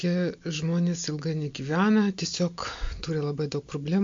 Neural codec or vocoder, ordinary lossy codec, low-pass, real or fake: none; MP3, 48 kbps; 7.2 kHz; real